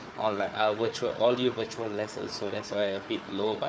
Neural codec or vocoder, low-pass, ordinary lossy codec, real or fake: codec, 16 kHz, 4 kbps, FunCodec, trained on Chinese and English, 50 frames a second; none; none; fake